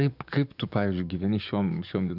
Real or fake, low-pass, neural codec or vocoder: fake; 5.4 kHz; codec, 44.1 kHz, 7.8 kbps, DAC